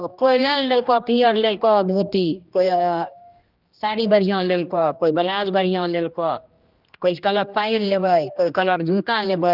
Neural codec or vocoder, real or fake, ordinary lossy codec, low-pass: codec, 16 kHz, 1 kbps, X-Codec, HuBERT features, trained on general audio; fake; Opus, 32 kbps; 5.4 kHz